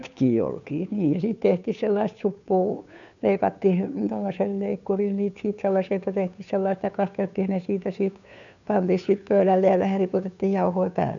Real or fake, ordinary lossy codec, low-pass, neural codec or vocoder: fake; none; 7.2 kHz; codec, 16 kHz, 2 kbps, FunCodec, trained on Chinese and English, 25 frames a second